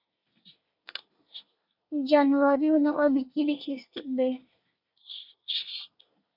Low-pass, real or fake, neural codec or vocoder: 5.4 kHz; fake; codec, 24 kHz, 1 kbps, SNAC